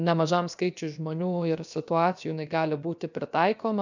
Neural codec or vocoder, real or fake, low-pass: codec, 16 kHz, about 1 kbps, DyCAST, with the encoder's durations; fake; 7.2 kHz